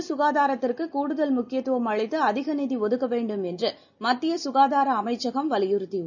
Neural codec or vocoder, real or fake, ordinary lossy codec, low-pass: none; real; none; 7.2 kHz